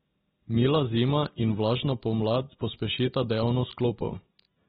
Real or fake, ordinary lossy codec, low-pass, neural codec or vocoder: real; AAC, 16 kbps; 7.2 kHz; none